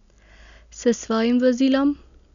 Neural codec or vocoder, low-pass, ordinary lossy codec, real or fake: none; 7.2 kHz; none; real